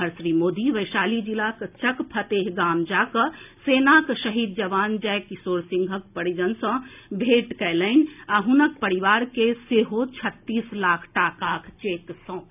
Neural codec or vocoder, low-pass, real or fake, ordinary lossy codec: none; 3.6 kHz; real; none